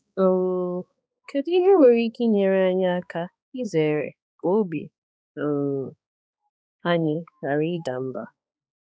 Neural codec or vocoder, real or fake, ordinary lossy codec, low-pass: codec, 16 kHz, 2 kbps, X-Codec, HuBERT features, trained on balanced general audio; fake; none; none